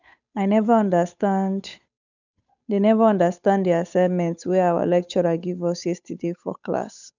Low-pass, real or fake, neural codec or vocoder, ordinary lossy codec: 7.2 kHz; fake; codec, 16 kHz, 8 kbps, FunCodec, trained on Chinese and English, 25 frames a second; none